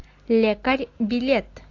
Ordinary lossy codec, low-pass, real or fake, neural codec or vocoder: AAC, 48 kbps; 7.2 kHz; real; none